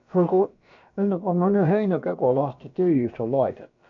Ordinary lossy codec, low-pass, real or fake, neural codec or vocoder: none; 7.2 kHz; fake; codec, 16 kHz, about 1 kbps, DyCAST, with the encoder's durations